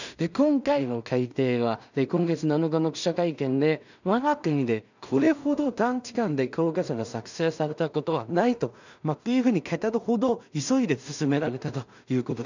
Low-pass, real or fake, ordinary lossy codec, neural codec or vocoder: 7.2 kHz; fake; none; codec, 16 kHz in and 24 kHz out, 0.4 kbps, LongCat-Audio-Codec, two codebook decoder